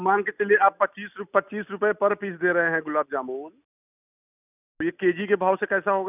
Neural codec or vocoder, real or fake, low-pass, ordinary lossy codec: none; real; 3.6 kHz; none